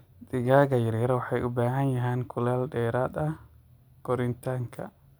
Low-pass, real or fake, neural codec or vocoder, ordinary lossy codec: none; real; none; none